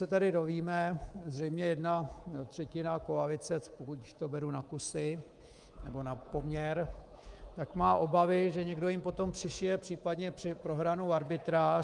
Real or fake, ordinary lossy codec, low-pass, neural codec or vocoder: fake; Opus, 32 kbps; 10.8 kHz; codec, 24 kHz, 3.1 kbps, DualCodec